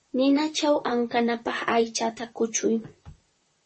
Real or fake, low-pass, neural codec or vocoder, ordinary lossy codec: fake; 10.8 kHz; vocoder, 44.1 kHz, 128 mel bands, Pupu-Vocoder; MP3, 32 kbps